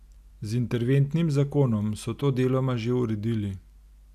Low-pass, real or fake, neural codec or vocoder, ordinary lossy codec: 14.4 kHz; real; none; none